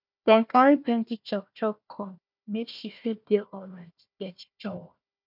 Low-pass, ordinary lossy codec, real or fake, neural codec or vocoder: 5.4 kHz; none; fake; codec, 16 kHz, 1 kbps, FunCodec, trained on Chinese and English, 50 frames a second